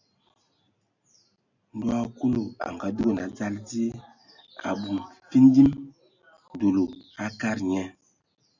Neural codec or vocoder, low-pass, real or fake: none; 7.2 kHz; real